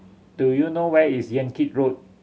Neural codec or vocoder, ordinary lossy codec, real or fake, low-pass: none; none; real; none